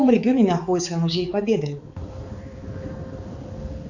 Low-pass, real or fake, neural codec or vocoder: 7.2 kHz; fake; codec, 16 kHz, 4 kbps, X-Codec, HuBERT features, trained on balanced general audio